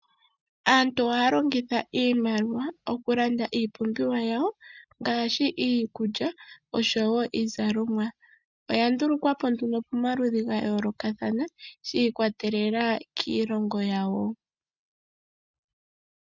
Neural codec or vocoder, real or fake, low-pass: none; real; 7.2 kHz